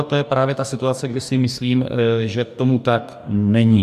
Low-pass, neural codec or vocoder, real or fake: 14.4 kHz; codec, 44.1 kHz, 2.6 kbps, DAC; fake